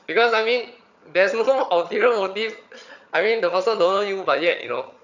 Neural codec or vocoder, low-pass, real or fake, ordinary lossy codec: vocoder, 22.05 kHz, 80 mel bands, HiFi-GAN; 7.2 kHz; fake; none